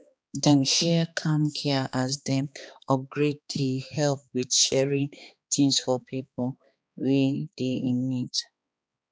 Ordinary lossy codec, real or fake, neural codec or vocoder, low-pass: none; fake; codec, 16 kHz, 2 kbps, X-Codec, HuBERT features, trained on balanced general audio; none